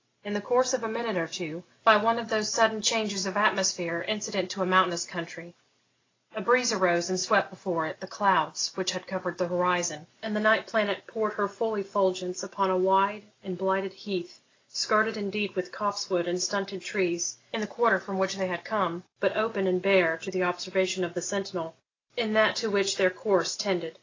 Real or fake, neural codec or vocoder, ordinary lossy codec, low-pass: real; none; AAC, 32 kbps; 7.2 kHz